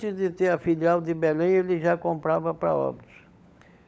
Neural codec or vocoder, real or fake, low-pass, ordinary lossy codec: codec, 16 kHz, 16 kbps, FunCodec, trained on LibriTTS, 50 frames a second; fake; none; none